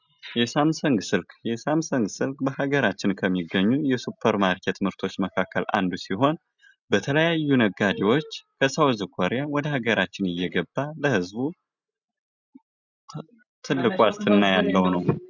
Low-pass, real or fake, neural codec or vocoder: 7.2 kHz; real; none